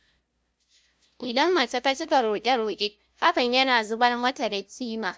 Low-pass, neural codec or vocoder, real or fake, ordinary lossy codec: none; codec, 16 kHz, 0.5 kbps, FunCodec, trained on LibriTTS, 25 frames a second; fake; none